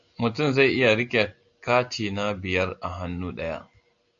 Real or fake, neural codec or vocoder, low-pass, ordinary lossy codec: real; none; 7.2 kHz; AAC, 64 kbps